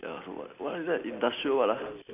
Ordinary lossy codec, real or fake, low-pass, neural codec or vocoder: none; real; 3.6 kHz; none